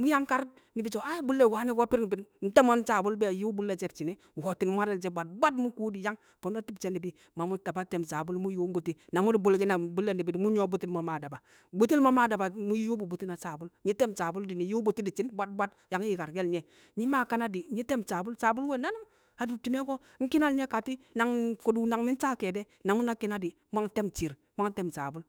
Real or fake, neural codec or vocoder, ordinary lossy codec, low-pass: fake; autoencoder, 48 kHz, 32 numbers a frame, DAC-VAE, trained on Japanese speech; none; none